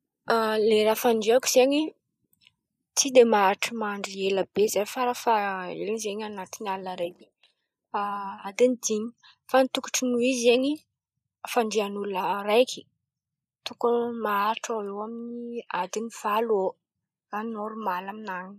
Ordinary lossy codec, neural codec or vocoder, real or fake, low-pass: none; none; real; 14.4 kHz